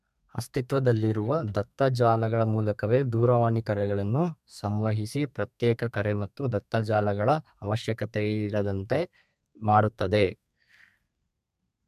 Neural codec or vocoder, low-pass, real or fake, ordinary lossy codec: codec, 32 kHz, 1.9 kbps, SNAC; 14.4 kHz; fake; MP3, 96 kbps